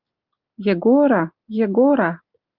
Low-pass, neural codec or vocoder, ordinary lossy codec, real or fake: 5.4 kHz; none; Opus, 24 kbps; real